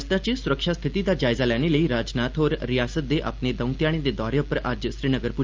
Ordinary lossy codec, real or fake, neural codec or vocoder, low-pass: Opus, 32 kbps; real; none; 7.2 kHz